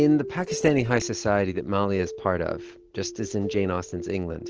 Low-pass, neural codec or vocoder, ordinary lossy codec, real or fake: 7.2 kHz; none; Opus, 16 kbps; real